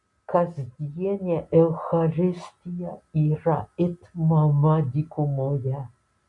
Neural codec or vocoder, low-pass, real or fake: none; 10.8 kHz; real